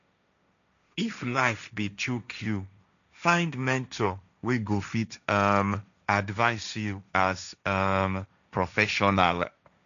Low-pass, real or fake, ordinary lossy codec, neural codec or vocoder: 7.2 kHz; fake; none; codec, 16 kHz, 1.1 kbps, Voila-Tokenizer